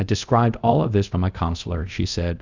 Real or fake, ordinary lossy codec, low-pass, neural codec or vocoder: fake; Opus, 64 kbps; 7.2 kHz; codec, 24 kHz, 0.5 kbps, DualCodec